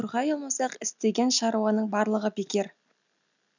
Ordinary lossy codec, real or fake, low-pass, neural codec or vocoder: none; real; 7.2 kHz; none